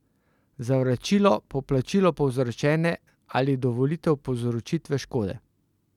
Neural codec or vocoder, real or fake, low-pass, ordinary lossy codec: none; real; 19.8 kHz; none